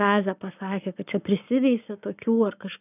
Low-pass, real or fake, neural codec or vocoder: 3.6 kHz; fake; codec, 44.1 kHz, 7.8 kbps, Pupu-Codec